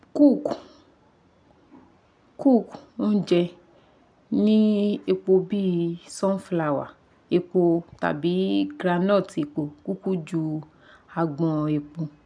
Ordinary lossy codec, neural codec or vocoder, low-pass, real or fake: none; none; 9.9 kHz; real